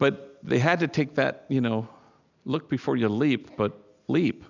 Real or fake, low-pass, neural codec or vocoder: real; 7.2 kHz; none